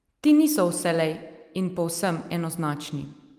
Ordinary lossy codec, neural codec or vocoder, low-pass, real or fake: Opus, 32 kbps; none; 14.4 kHz; real